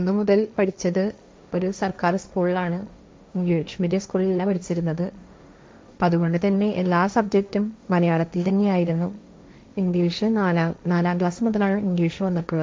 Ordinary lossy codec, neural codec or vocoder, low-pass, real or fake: none; codec, 16 kHz, 1.1 kbps, Voila-Tokenizer; none; fake